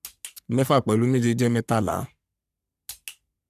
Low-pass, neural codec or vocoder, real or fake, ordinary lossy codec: 14.4 kHz; codec, 44.1 kHz, 3.4 kbps, Pupu-Codec; fake; none